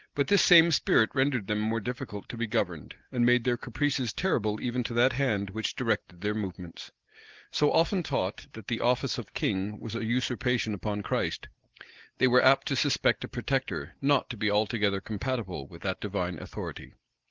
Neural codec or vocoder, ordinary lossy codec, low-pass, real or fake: none; Opus, 16 kbps; 7.2 kHz; real